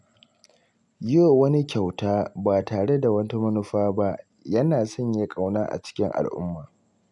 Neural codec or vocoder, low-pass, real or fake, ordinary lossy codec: none; 10.8 kHz; real; none